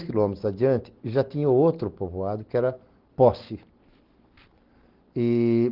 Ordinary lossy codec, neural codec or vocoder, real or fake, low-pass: Opus, 16 kbps; none; real; 5.4 kHz